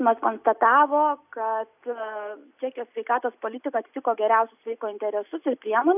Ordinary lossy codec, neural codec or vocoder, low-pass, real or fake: AAC, 32 kbps; none; 3.6 kHz; real